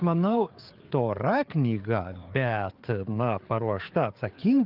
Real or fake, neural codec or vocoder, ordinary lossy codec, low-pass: fake; codec, 16 kHz, 4 kbps, FreqCodec, larger model; Opus, 32 kbps; 5.4 kHz